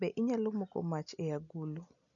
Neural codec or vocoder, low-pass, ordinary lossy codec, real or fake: none; 7.2 kHz; none; real